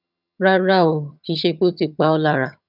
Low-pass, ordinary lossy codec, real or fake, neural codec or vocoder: 5.4 kHz; none; fake; vocoder, 22.05 kHz, 80 mel bands, HiFi-GAN